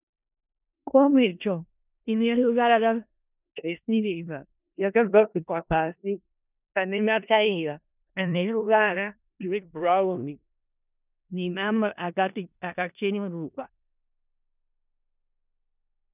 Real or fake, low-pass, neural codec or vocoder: fake; 3.6 kHz; codec, 16 kHz in and 24 kHz out, 0.4 kbps, LongCat-Audio-Codec, four codebook decoder